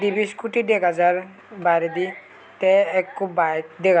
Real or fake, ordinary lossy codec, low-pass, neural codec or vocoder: real; none; none; none